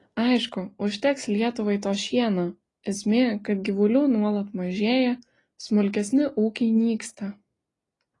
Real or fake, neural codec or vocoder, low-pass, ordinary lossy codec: real; none; 10.8 kHz; AAC, 32 kbps